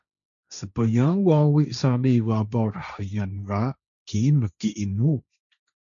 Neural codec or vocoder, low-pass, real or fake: codec, 16 kHz, 1.1 kbps, Voila-Tokenizer; 7.2 kHz; fake